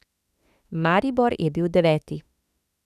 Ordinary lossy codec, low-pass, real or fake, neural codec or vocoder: none; 14.4 kHz; fake; autoencoder, 48 kHz, 32 numbers a frame, DAC-VAE, trained on Japanese speech